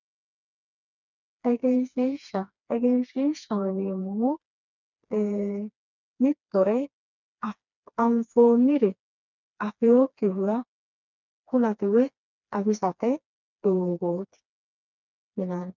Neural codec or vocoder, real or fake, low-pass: codec, 16 kHz, 2 kbps, FreqCodec, smaller model; fake; 7.2 kHz